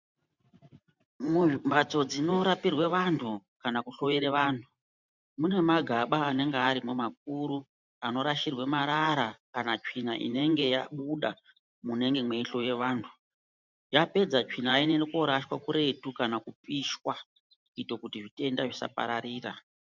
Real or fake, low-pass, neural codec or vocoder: fake; 7.2 kHz; vocoder, 44.1 kHz, 128 mel bands every 512 samples, BigVGAN v2